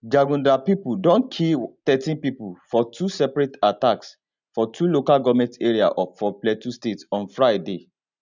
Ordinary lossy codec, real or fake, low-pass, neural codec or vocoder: none; real; 7.2 kHz; none